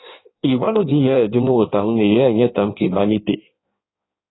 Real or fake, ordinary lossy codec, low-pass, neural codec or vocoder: fake; AAC, 16 kbps; 7.2 kHz; codec, 16 kHz in and 24 kHz out, 1.1 kbps, FireRedTTS-2 codec